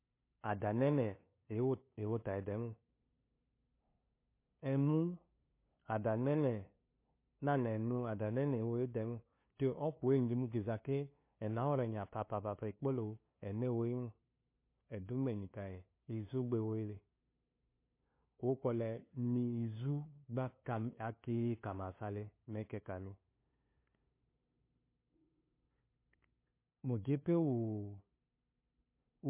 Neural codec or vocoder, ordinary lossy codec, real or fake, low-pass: codec, 16 kHz, 2 kbps, FunCodec, trained on LibriTTS, 25 frames a second; MP3, 24 kbps; fake; 3.6 kHz